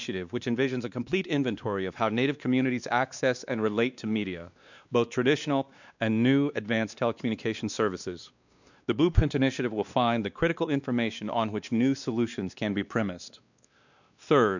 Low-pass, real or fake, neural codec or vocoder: 7.2 kHz; fake; codec, 16 kHz, 2 kbps, X-Codec, WavLM features, trained on Multilingual LibriSpeech